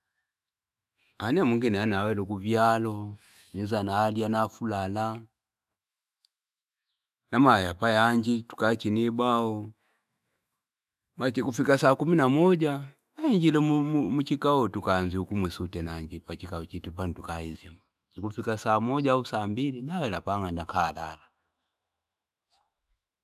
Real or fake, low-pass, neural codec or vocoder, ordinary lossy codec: fake; 14.4 kHz; autoencoder, 48 kHz, 128 numbers a frame, DAC-VAE, trained on Japanese speech; none